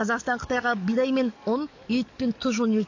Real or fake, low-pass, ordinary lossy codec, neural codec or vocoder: fake; 7.2 kHz; AAC, 48 kbps; codec, 44.1 kHz, 7.8 kbps, DAC